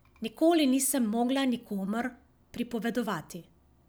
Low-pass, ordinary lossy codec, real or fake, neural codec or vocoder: none; none; real; none